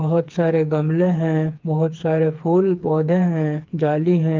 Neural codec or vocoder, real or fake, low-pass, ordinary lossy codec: codec, 16 kHz, 4 kbps, FreqCodec, smaller model; fake; 7.2 kHz; Opus, 24 kbps